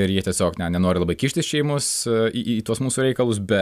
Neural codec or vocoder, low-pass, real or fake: none; 14.4 kHz; real